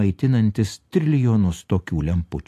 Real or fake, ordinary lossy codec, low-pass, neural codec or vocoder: real; AAC, 64 kbps; 14.4 kHz; none